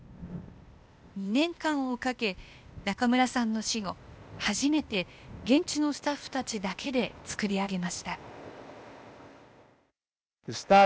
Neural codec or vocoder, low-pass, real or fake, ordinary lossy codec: codec, 16 kHz, 0.8 kbps, ZipCodec; none; fake; none